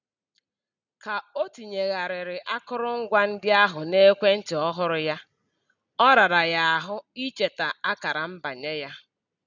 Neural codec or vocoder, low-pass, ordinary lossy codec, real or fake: none; 7.2 kHz; none; real